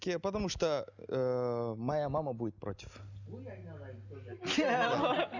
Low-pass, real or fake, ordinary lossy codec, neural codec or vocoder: 7.2 kHz; real; none; none